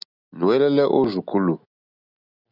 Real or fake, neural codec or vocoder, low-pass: real; none; 5.4 kHz